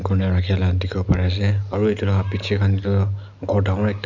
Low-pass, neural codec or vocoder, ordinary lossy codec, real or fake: 7.2 kHz; none; none; real